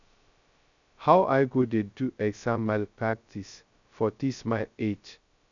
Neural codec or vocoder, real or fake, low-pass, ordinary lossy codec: codec, 16 kHz, 0.2 kbps, FocalCodec; fake; 7.2 kHz; none